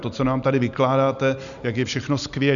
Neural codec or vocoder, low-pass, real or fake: none; 7.2 kHz; real